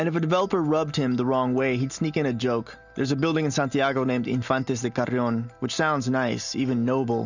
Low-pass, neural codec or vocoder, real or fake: 7.2 kHz; none; real